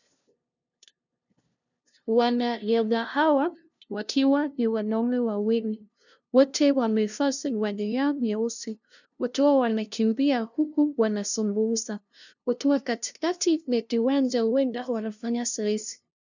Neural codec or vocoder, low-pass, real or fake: codec, 16 kHz, 0.5 kbps, FunCodec, trained on LibriTTS, 25 frames a second; 7.2 kHz; fake